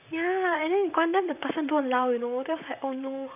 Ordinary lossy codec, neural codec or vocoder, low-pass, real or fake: none; vocoder, 44.1 kHz, 128 mel bands, Pupu-Vocoder; 3.6 kHz; fake